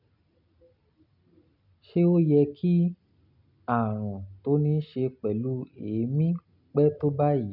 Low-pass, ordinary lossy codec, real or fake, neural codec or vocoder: 5.4 kHz; none; real; none